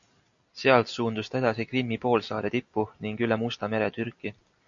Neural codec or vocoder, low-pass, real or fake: none; 7.2 kHz; real